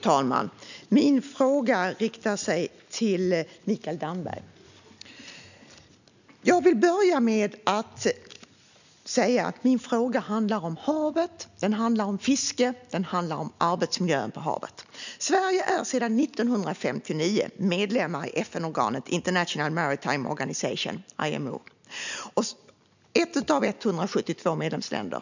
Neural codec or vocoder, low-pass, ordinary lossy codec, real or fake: none; 7.2 kHz; none; real